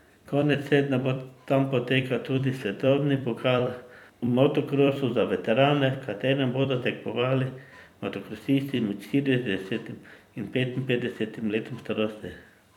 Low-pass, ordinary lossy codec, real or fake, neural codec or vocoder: 19.8 kHz; none; fake; vocoder, 44.1 kHz, 128 mel bands every 256 samples, BigVGAN v2